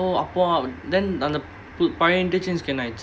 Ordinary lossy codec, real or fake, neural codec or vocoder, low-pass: none; real; none; none